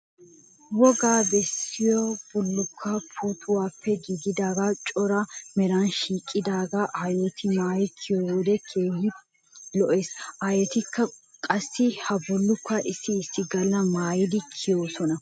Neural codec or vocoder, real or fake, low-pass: none; real; 9.9 kHz